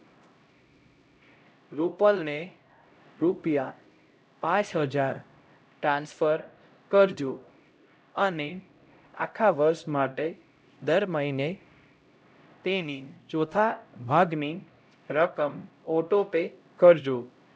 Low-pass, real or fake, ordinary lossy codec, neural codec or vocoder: none; fake; none; codec, 16 kHz, 0.5 kbps, X-Codec, HuBERT features, trained on LibriSpeech